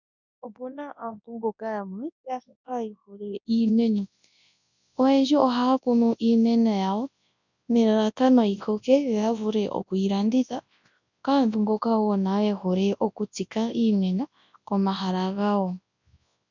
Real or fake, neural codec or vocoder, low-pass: fake; codec, 24 kHz, 0.9 kbps, WavTokenizer, large speech release; 7.2 kHz